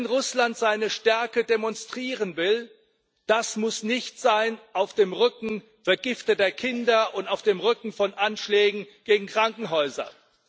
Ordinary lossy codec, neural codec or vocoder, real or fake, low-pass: none; none; real; none